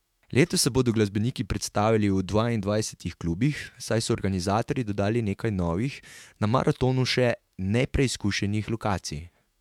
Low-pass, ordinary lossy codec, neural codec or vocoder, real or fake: 19.8 kHz; MP3, 96 kbps; autoencoder, 48 kHz, 128 numbers a frame, DAC-VAE, trained on Japanese speech; fake